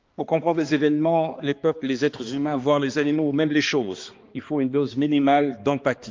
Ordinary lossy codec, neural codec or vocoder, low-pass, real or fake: Opus, 24 kbps; codec, 16 kHz, 2 kbps, X-Codec, HuBERT features, trained on balanced general audio; 7.2 kHz; fake